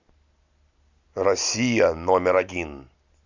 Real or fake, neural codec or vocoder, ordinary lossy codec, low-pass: real; none; Opus, 64 kbps; 7.2 kHz